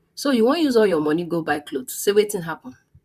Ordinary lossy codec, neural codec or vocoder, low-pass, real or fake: none; vocoder, 44.1 kHz, 128 mel bands, Pupu-Vocoder; 14.4 kHz; fake